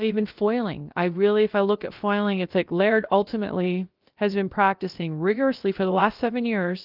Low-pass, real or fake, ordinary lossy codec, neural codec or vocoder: 5.4 kHz; fake; Opus, 32 kbps; codec, 16 kHz, about 1 kbps, DyCAST, with the encoder's durations